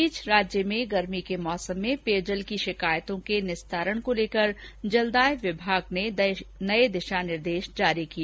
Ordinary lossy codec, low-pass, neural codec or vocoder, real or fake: none; none; none; real